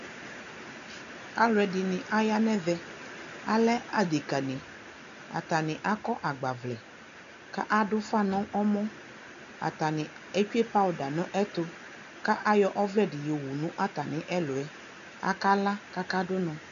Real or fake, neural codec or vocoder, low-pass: real; none; 7.2 kHz